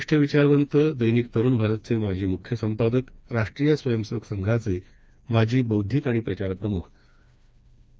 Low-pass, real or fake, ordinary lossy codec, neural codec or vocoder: none; fake; none; codec, 16 kHz, 2 kbps, FreqCodec, smaller model